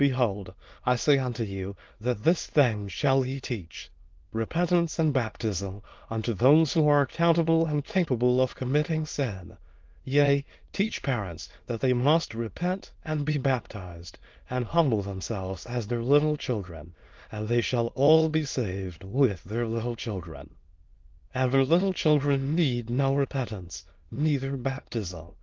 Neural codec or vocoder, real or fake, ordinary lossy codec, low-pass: autoencoder, 22.05 kHz, a latent of 192 numbers a frame, VITS, trained on many speakers; fake; Opus, 24 kbps; 7.2 kHz